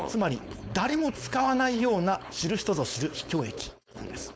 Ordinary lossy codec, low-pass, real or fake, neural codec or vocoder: none; none; fake; codec, 16 kHz, 4.8 kbps, FACodec